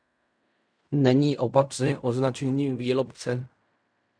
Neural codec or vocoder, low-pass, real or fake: codec, 16 kHz in and 24 kHz out, 0.4 kbps, LongCat-Audio-Codec, fine tuned four codebook decoder; 9.9 kHz; fake